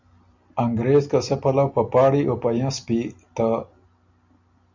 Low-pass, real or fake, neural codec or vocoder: 7.2 kHz; real; none